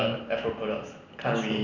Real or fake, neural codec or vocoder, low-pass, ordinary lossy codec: real; none; 7.2 kHz; none